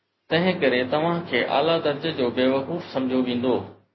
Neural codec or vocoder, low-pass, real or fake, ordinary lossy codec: none; 7.2 kHz; real; MP3, 24 kbps